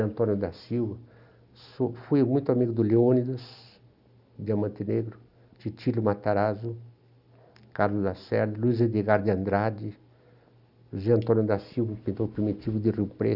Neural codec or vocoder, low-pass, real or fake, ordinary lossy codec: none; 5.4 kHz; real; none